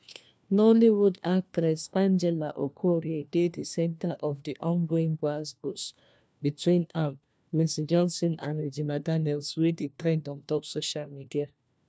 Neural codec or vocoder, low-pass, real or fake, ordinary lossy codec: codec, 16 kHz, 1 kbps, FunCodec, trained on LibriTTS, 50 frames a second; none; fake; none